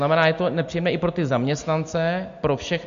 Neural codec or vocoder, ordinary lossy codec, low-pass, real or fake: none; MP3, 48 kbps; 7.2 kHz; real